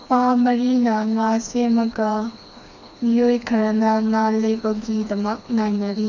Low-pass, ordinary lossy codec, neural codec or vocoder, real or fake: 7.2 kHz; none; codec, 16 kHz, 2 kbps, FreqCodec, smaller model; fake